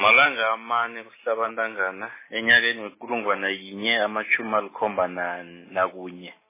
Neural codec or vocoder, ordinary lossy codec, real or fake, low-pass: none; MP3, 16 kbps; real; 3.6 kHz